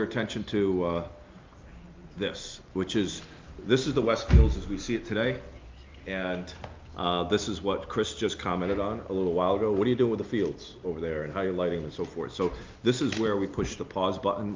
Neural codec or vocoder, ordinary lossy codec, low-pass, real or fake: none; Opus, 24 kbps; 7.2 kHz; real